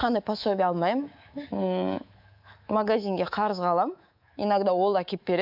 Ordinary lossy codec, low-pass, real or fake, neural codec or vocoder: AAC, 48 kbps; 5.4 kHz; fake; codec, 24 kHz, 3.1 kbps, DualCodec